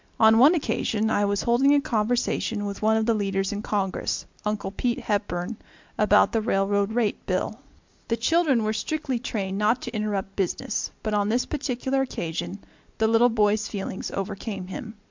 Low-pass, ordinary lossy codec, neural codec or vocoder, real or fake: 7.2 kHz; MP3, 64 kbps; none; real